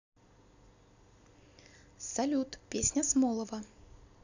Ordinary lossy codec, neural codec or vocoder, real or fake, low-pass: none; none; real; 7.2 kHz